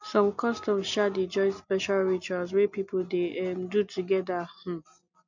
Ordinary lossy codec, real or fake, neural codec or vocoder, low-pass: none; real; none; 7.2 kHz